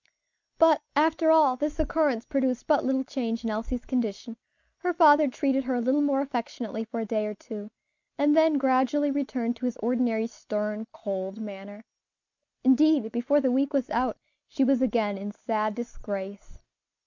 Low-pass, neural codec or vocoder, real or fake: 7.2 kHz; none; real